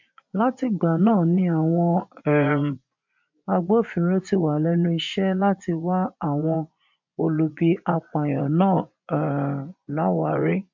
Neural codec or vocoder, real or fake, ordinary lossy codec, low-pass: vocoder, 24 kHz, 100 mel bands, Vocos; fake; MP3, 48 kbps; 7.2 kHz